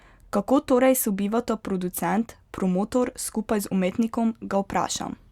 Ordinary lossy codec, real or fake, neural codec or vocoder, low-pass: Opus, 64 kbps; real; none; 19.8 kHz